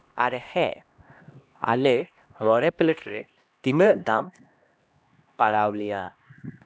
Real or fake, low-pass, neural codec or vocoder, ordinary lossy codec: fake; none; codec, 16 kHz, 1 kbps, X-Codec, HuBERT features, trained on LibriSpeech; none